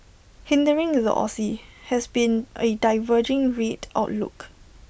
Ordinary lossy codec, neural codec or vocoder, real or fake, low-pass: none; none; real; none